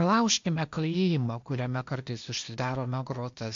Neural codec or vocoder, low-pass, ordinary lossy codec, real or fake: codec, 16 kHz, 0.8 kbps, ZipCodec; 7.2 kHz; MP3, 48 kbps; fake